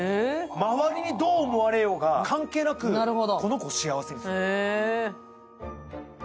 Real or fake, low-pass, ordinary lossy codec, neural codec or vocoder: real; none; none; none